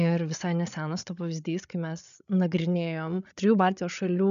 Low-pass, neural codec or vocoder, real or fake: 7.2 kHz; codec, 16 kHz, 8 kbps, FreqCodec, larger model; fake